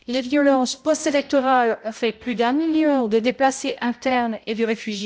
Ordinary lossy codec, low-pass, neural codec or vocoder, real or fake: none; none; codec, 16 kHz, 0.5 kbps, X-Codec, HuBERT features, trained on balanced general audio; fake